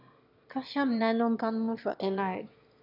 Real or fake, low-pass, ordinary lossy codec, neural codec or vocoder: fake; 5.4 kHz; none; autoencoder, 22.05 kHz, a latent of 192 numbers a frame, VITS, trained on one speaker